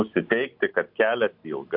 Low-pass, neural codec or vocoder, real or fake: 5.4 kHz; none; real